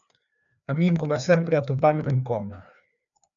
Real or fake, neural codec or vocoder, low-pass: fake; codec, 16 kHz, 2 kbps, FreqCodec, larger model; 7.2 kHz